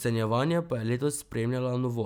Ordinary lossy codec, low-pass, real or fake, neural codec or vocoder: none; none; real; none